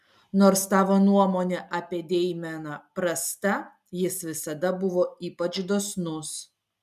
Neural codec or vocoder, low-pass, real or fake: none; 14.4 kHz; real